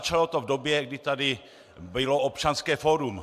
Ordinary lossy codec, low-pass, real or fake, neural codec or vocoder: AAC, 96 kbps; 14.4 kHz; real; none